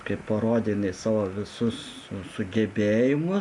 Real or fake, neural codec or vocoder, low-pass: real; none; 10.8 kHz